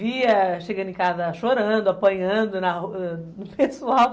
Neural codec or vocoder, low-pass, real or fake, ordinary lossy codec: none; none; real; none